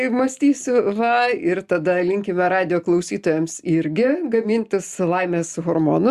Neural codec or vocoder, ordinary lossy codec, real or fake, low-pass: none; Opus, 64 kbps; real; 14.4 kHz